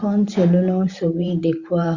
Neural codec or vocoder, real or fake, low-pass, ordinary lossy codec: vocoder, 44.1 kHz, 128 mel bands every 512 samples, BigVGAN v2; fake; 7.2 kHz; Opus, 64 kbps